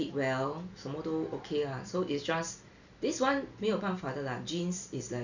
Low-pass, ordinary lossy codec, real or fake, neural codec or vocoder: 7.2 kHz; none; real; none